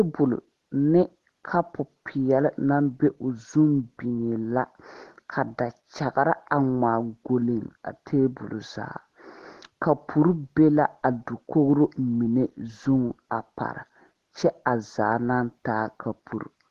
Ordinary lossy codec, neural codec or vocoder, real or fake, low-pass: Opus, 16 kbps; none; real; 14.4 kHz